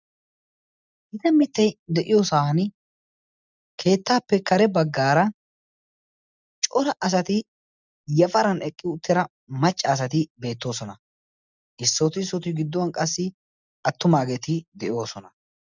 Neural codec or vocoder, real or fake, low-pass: none; real; 7.2 kHz